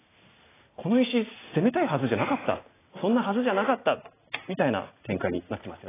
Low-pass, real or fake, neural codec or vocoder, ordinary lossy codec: 3.6 kHz; real; none; AAC, 16 kbps